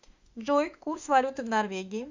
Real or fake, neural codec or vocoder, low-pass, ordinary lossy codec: fake; autoencoder, 48 kHz, 32 numbers a frame, DAC-VAE, trained on Japanese speech; 7.2 kHz; Opus, 64 kbps